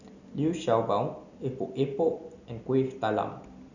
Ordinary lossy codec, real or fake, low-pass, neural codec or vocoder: none; real; 7.2 kHz; none